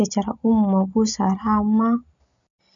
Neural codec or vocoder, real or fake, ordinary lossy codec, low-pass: none; real; none; 7.2 kHz